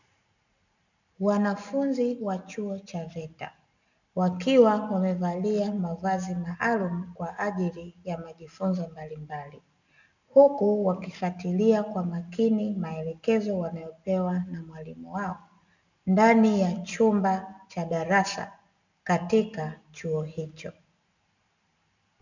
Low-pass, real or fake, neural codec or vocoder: 7.2 kHz; real; none